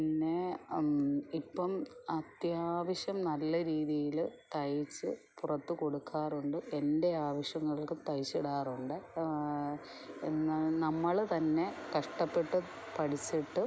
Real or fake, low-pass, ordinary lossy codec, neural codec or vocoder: real; none; none; none